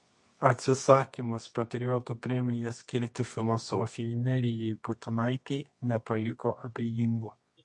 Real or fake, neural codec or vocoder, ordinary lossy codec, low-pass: fake; codec, 24 kHz, 0.9 kbps, WavTokenizer, medium music audio release; MP3, 48 kbps; 10.8 kHz